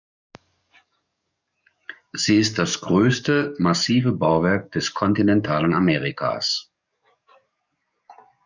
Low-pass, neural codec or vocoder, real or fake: 7.2 kHz; codec, 44.1 kHz, 7.8 kbps, DAC; fake